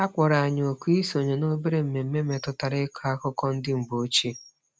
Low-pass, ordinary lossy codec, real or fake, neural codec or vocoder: none; none; real; none